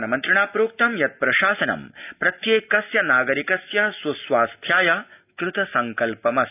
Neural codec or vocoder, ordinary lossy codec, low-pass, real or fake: none; none; 3.6 kHz; real